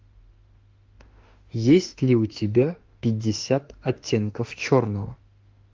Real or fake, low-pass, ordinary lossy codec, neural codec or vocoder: fake; 7.2 kHz; Opus, 32 kbps; autoencoder, 48 kHz, 32 numbers a frame, DAC-VAE, trained on Japanese speech